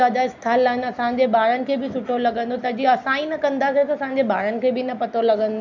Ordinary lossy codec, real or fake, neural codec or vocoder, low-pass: none; real; none; 7.2 kHz